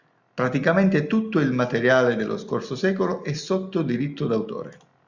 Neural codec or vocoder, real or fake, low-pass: none; real; 7.2 kHz